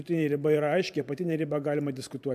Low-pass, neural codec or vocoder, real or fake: 14.4 kHz; vocoder, 44.1 kHz, 128 mel bands every 512 samples, BigVGAN v2; fake